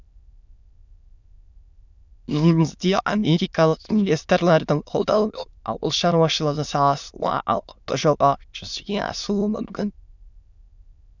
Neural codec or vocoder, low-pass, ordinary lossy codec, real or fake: autoencoder, 22.05 kHz, a latent of 192 numbers a frame, VITS, trained on many speakers; 7.2 kHz; none; fake